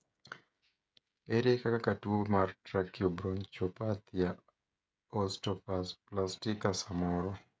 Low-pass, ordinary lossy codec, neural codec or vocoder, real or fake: none; none; codec, 16 kHz, 16 kbps, FreqCodec, smaller model; fake